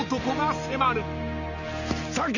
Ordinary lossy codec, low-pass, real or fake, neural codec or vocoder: none; 7.2 kHz; real; none